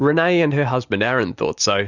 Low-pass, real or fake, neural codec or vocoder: 7.2 kHz; real; none